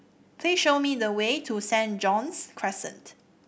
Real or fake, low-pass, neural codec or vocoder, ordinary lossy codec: real; none; none; none